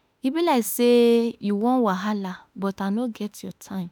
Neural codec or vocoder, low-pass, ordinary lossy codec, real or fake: autoencoder, 48 kHz, 32 numbers a frame, DAC-VAE, trained on Japanese speech; none; none; fake